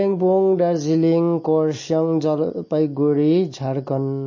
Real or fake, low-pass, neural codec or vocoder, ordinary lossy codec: real; 7.2 kHz; none; MP3, 32 kbps